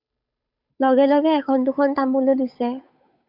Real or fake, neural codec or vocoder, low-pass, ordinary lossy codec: fake; codec, 16 kHz, 8 kbps, FunCodec, trained on Chinese and English, 25 frames a second; 5.4 kHz; AAC, 48 kbps